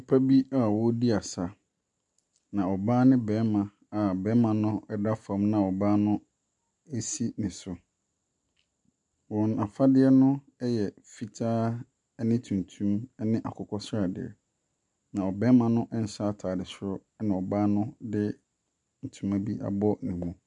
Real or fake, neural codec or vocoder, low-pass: real; none; 10.8 kHz